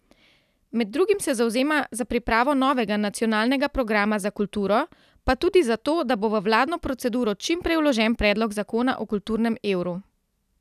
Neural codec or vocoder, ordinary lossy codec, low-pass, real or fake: none; none; 14.4 kHz; real